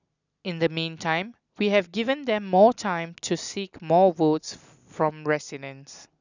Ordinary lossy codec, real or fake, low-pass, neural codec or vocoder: none; real; 7.2 kHz; none